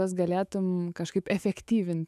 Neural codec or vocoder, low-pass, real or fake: autoencoder, 48 kHz, 128 numbers a frame, DAC-VAE, trained on Japanese speech; 14.4 kHz; fake